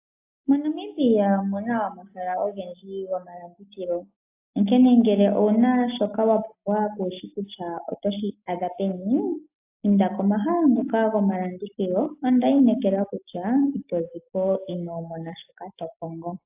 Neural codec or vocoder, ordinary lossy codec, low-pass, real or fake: none; AAC, 32 kbps; 3.6 kHz; real